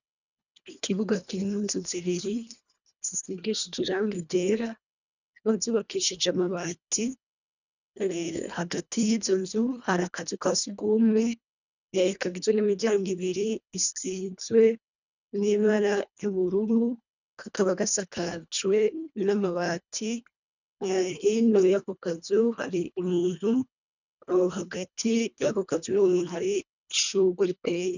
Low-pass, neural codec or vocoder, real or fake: 7.2 kHz; codec, 24 kHz, 1.5 kbps, HILCodec; fake